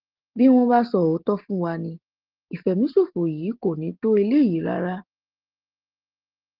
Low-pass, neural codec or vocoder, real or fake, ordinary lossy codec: 5.4 kHz; none; real; Opus, 16 kbps